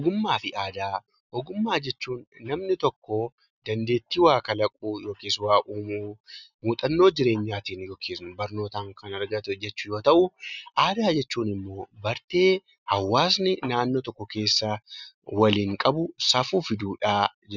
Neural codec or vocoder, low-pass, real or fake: none; 7.2 kHz; real